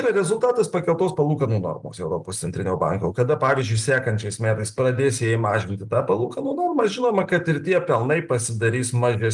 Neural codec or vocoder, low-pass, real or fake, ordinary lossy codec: none; 10.8 kHz; real; Opus, 32 kbps